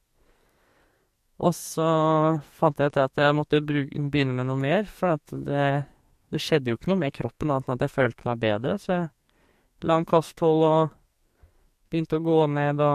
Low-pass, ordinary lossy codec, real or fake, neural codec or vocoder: 14.4 kHz; MP3, 64 kbps; fake; codec, 44.1 kHz, 2.6 kbps, SNAC